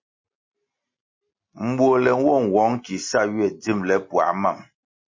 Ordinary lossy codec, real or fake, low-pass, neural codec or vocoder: MP3, 32 kbps; real; 7.2 kHz; none